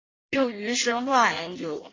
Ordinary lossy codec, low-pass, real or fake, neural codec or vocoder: AAC, 32 kbps; 7.2 kHz; fake; codec, 16 kHz in and 24 kHz out, 0.6 kbps, FireRedTTS-2 codec